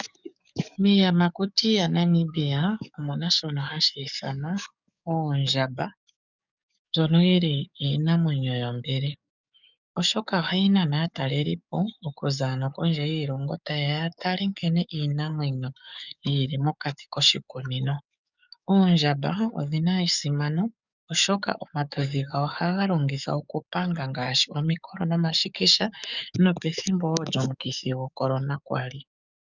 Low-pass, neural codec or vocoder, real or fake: 7.2 kHz; codec, 44.1 kHz, 7.8 kbps, DAC; fake